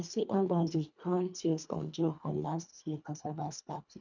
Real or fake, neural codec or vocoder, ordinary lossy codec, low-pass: fake; codec, 24 kHz, 1.5 kbps, HILCodec; none; 7.2 kHz